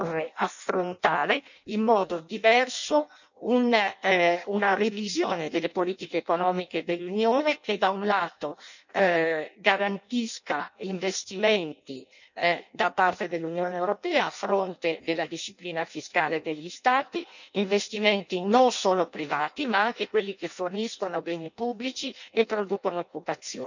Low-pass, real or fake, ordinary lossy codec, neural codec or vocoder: 7.2 kHz; fake; none; codec, 16 kHz in and 24 kHz out, 0.6 kbps, FireRedTTS-2 codec